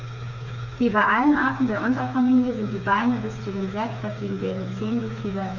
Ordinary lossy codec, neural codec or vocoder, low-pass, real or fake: none; codec, 16 kHz, 4 kbps, FreqCodec, smaller model; 7.2 kHz; fake